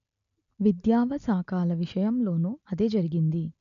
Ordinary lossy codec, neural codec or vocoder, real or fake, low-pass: none; none; real; 7.2 kHz